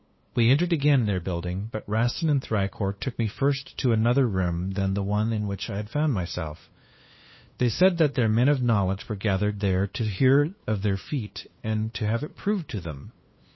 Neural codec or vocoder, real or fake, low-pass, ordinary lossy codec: codec, 16 kHz, 2 kbps, FunCodec, trained on LibriTTS, 25 frames a second; fake; 7.2 kHz; MP3, 24 kbps